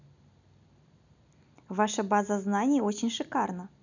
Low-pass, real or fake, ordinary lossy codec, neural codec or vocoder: 7.2 kHz; real; none; none